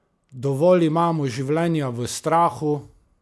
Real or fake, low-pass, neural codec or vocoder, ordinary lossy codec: real; none; none; none